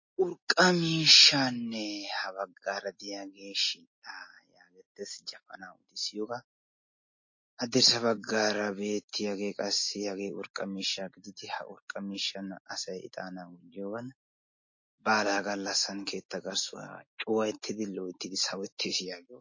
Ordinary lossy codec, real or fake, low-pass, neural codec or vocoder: MP3, 32 kbps; real; 7.2 kHz; none